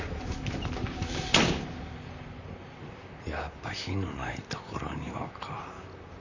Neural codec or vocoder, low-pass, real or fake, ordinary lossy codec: vocoder, 44.1 kHz, 128 mel bands, Pupu-Vocoder; 7.2 kHz; fake; none